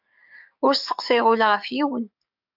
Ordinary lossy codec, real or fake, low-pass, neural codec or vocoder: AAC, 48 kbps; fake; 5.4 kHz; codec, 44.1 kHz, 7.8 kbps, DAC